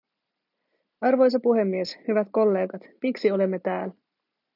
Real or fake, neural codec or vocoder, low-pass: real; none; 5.4 kHz